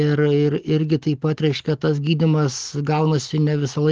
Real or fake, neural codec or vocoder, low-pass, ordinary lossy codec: real; none; 7.2 kHz; Opus, 16 kbps